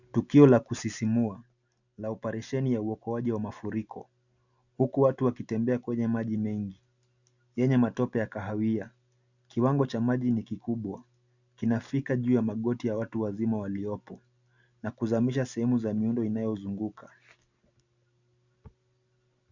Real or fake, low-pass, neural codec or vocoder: real; 7.2 kHz; none